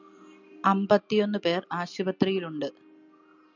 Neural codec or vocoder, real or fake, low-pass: none; real; 7.2 kHz